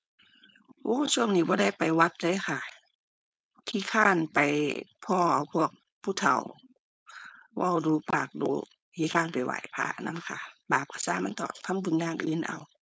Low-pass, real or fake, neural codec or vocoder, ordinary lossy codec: none; fake; codec, 16 kHz, 4.8 kbps, FACodec; none